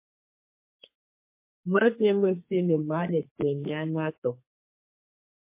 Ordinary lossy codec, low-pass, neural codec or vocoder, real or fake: MP3, 24 kbps; 3.6 kHz; codec, 32 kHz, 1.9 kbps, SNAC; fake